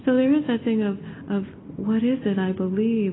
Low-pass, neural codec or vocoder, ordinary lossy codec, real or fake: 7.2 kHz; none; AAC, 16 kbps; real